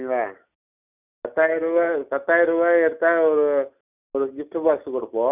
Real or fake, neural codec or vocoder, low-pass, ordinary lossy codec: real; none; 3.6 kHz; none